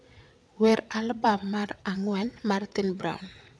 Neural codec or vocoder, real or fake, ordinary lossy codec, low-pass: vocoder, 22.05 kHz, 80 mel bands, WaveNeXt; fake; none; none